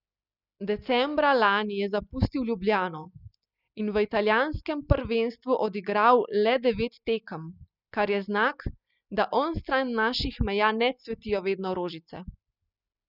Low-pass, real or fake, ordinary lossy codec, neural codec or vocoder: 5.4 kHz; real; none; none